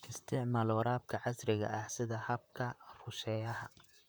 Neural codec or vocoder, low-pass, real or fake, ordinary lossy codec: vocoder, 44.1 kHz, 128 mel bands, Pupu-Vocoder; none; fake; none